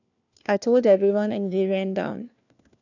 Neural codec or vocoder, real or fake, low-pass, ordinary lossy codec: codec, 16 kHz, 1 kbps, FunCodec, trained on LibriTTS, 50 frames a second; fake; 7.2 kHz; none